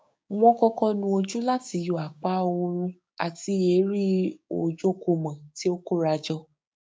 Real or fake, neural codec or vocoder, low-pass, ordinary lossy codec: fake; codec, 16 kHz, 6 kbps, DAC; none; none